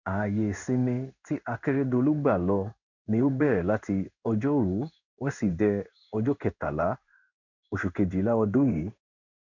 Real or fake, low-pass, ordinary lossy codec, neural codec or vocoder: fake; 7.2 kHz; none; codec, 16 kHz in and 24 kHz out, 1 kbps, XY-Tokenizer